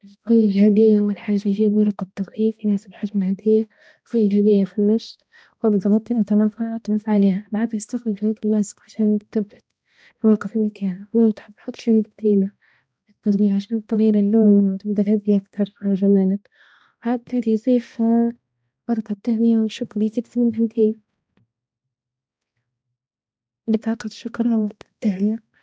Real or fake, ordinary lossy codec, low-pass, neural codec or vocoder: fake; none; none; codec, 16 kHz, 1 kbps, X-Codec, HuBERT features, trained on balanced general audio